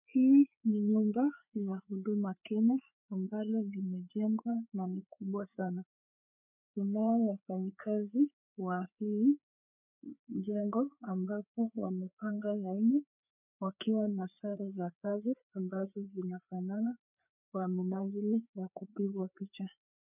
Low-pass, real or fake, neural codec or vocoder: 3.6 kHz; fake; codec, 16 kHz, 4 kbps, FreqCodec, larger model